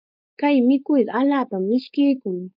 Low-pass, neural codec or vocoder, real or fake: 5.4 kHz; codec, 16 kHz, 4.8 kbps, FACodec; fake